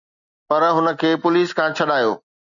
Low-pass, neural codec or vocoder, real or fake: 7.2 kHz; none; real